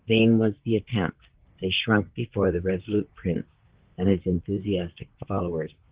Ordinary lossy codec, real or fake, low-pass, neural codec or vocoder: Opus, 16 kbps; fake; 3.6 kHz; vocoder, 44.1 kHz, 128 mel bands, Pupu-Vocoder